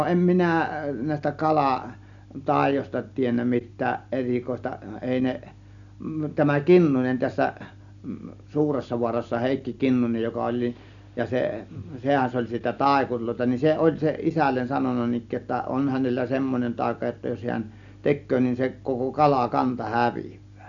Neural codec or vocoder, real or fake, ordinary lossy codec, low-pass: none; real; none; 7.2 kHz